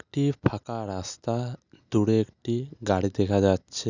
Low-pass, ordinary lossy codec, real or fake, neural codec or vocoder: 7.2 kHz; none; real; none